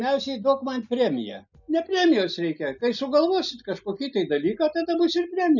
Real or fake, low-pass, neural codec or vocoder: real; 7.2 kHz; none